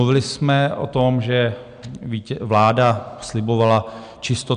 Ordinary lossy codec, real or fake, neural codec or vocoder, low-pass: MP3, 96 kbps; real; none; 9.9 kHz